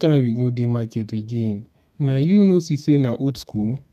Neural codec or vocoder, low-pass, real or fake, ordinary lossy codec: codec, 32 kHz, 1.9 kbps, SNAC; 14.4 kHz; fake; none